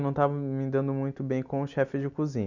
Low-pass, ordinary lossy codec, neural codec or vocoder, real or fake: 7.2 kHz; none; none; real